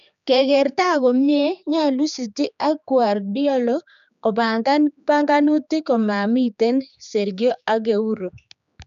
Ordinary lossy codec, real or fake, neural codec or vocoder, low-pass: none; fake; codec, 16 kHz, 4 kbps, X-Codec, HuBERT features, trained on general audio; 7.2 kHz